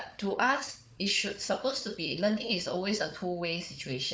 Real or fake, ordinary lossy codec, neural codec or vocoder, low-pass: fake; none; codec, 16 kHz, 4 kbps, FunCodec, trained on Chinese and English, 50 frames a second; none